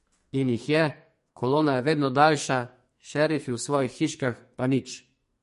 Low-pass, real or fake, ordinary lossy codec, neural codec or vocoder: 14.4 kHz; fake; MP3, 48 kbps; codec, 44.1 kHz, 2.6 kbps, SNAC